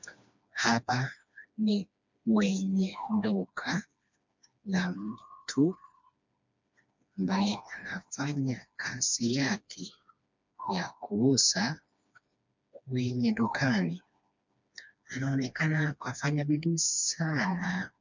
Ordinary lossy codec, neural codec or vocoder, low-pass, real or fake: MP3, 64 kbps; codec, 16 kHz, 2 kbps, FreqCodec, smaller model; 7.2 kHz; fake